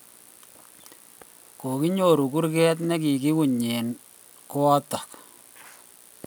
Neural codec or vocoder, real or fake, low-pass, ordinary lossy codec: none; real; none; none